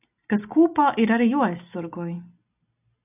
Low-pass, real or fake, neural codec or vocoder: 3.6 kHz; real; none